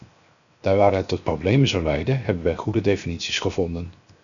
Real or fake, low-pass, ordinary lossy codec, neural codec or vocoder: fake; 7.2 kHz; MP3, 96 kbps; codec, 16 kHz, 0.7 kbps, FocalCodec